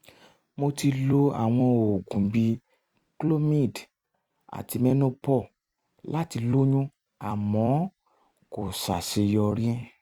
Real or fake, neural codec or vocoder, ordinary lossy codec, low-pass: fake; vocoder, 44.1 kHz, 128 mel bands every 256 samples, BigVGAN v2; Opus, 64 kbps; 19.8 kHz